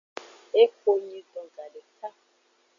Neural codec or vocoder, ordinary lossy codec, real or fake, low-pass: none; MP3, 64 kbps; real; 7.2 kHz